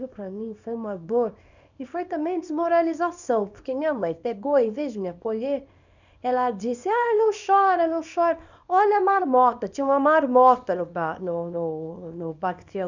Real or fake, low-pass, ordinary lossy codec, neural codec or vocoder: fake; 7.2 kHz; none; codec, 24 kHz, 0.9 kbps, WavTokenizer, small release